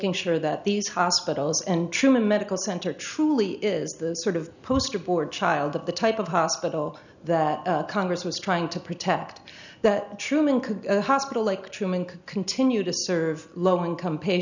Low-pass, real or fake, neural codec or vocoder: 7.2 kHz; real; none